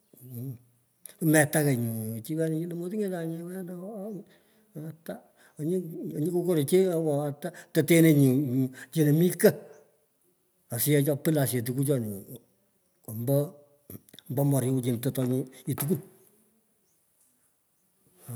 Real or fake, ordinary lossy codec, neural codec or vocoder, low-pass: real; none; none; none